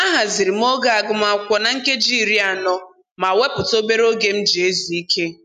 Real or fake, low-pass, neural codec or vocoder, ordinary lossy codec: real; 14.4 kHz; none; none